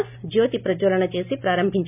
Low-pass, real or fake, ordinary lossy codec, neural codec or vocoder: 3.6 kHz; real; none; none